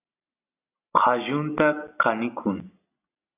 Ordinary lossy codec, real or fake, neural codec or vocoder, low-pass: AAC, 32 kbps; real; none; 3.6 kHz